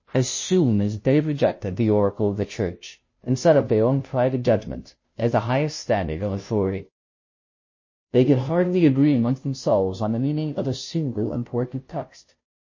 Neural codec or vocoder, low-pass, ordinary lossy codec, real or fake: codec, 16 kHz, 0.5 kbps, FunCodec, trained on Chinese and English, 25 frames a second; 7.2 kHz; MP3, 32 kbps; fake